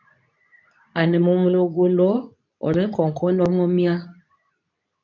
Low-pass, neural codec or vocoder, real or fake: 7.2 kHz; codec, 24 kHz, 0.9 kbps, WavTokenizer, medium speech release version 2; fake